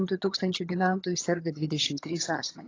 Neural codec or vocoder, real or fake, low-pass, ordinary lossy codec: vocoder, 22.05 kHz, 80 mel bands, HiFi-GAN; fake; 7.2 kHz; AAC, 32 kbps